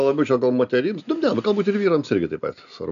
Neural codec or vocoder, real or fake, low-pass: none; real; 7.2 kHz